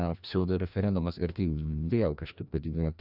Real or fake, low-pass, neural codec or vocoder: fake; 5.4 kHz; codec, 16 kHz, 1 kbps, FreqCodec, larger model